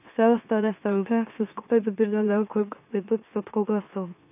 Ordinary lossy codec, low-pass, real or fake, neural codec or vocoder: AAC, 32 kbps; 3.6 kHz; fake; autoencoder, 44.1 kHz, a latent of 192 numbers a frame, MeloTTS